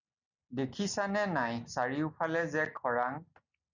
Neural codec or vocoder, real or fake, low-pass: none; real; 7.2 kHz